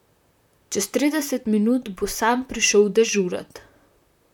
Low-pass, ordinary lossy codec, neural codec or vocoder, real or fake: 19.8 kHz; none; vocoder, 44.1 kHz, 128 mel bands, Pupu-Vocoder; fake